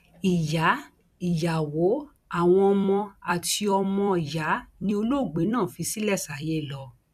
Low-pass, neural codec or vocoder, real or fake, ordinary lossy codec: 14.4 kHz; vocoder, 44.1 kHz, 128 mel bands every 256 samples, BigVGAN v2; fake; none